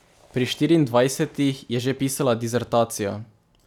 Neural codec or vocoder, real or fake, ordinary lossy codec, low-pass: none; real; none; 19.8 kHz